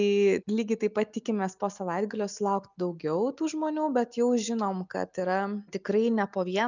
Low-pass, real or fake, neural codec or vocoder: 7.2 kHz; real; none